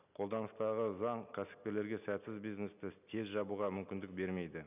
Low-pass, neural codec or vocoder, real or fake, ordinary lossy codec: 3.6 kHz; none; real; none